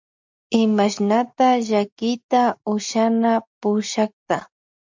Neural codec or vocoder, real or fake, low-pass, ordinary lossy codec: none; real; 7.2 kHz; MP3, 64 kbps